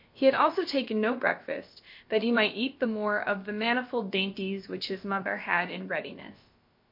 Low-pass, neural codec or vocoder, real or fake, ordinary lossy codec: 5.4 kHz; codec, 16 kHz, about 1 kbps, DyCAST, with the encoder's durations; fake; MP3, 32 kbps